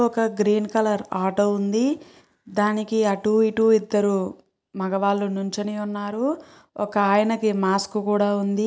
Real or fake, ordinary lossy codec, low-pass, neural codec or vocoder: real; none; none; none